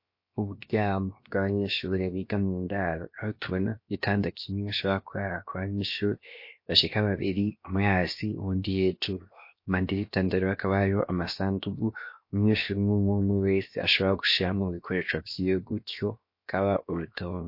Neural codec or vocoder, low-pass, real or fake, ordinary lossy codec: codec, 16 kHz, 0.7 kbps, FocalCodec; 5.4 kHz; fake; MP3, 32 kbps